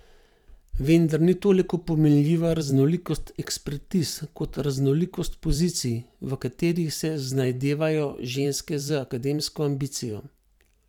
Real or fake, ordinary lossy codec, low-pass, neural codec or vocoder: fake; none; 19.8 kHz; vocoder, 44.1 kHz, 128 mel bands every 512 samples, BigVGAN v2